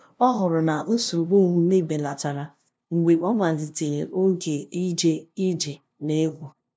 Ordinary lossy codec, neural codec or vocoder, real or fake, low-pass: none; codec, 16 kHz, 0.5 kbps, FunCodec, trained on LibriTTS, 25 frames a second; fake; none